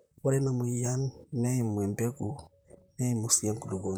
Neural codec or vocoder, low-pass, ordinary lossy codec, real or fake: vocoder, 44.1 kHz, 128 mel bands, Pupu-Vocoder; none; none; fake